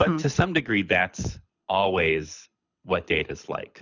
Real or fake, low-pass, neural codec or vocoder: fake; 7.2 kHz; codec, 24 kHz, 6 kbps, HILCodec